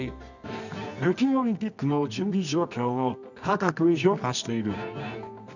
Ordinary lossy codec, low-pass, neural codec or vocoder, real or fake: none; 7.2 kHz; codec, 24 kHz, 0.9 kbps, WavTokenizer, medium music audio release; fake